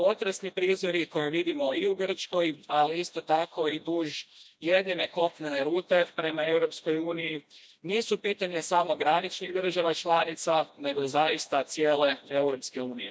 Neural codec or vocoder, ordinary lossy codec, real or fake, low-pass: codec, 16 kHz, 1 kbps, FreqCodec, smaller model; none; fake; none